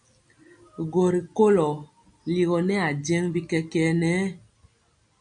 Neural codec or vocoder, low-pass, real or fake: none; 9.9 kHz; real